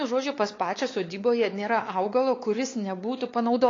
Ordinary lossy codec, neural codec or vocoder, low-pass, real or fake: AAC, 32 kbps; codec, 16 kHz, 4 kbps, X-Codec, WavLM features, trained on Multilingual LibriSpeech; 7.2 kHz; fake